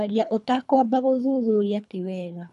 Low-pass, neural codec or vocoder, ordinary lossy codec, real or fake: 10.8 kHz; codec, 24 kHz, 3 kbps, HILCodec; none; fake